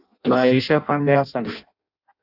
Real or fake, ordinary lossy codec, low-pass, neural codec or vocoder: fake; AAC, 48 kbps; 5.4 kHz; codec, 16 kHz in and 24 kHz out, 0.6 kbps, FireRedTTS-2 codec